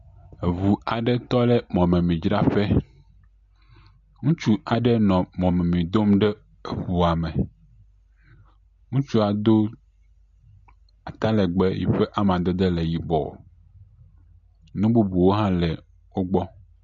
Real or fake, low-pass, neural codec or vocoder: real; 7.2 kHz; none